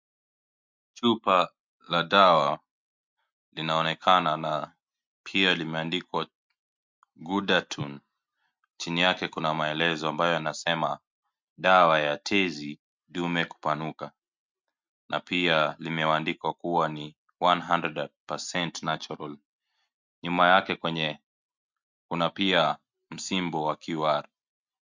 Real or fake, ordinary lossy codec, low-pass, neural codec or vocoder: real; MP3, 64 kbps; 7.2 kHz; none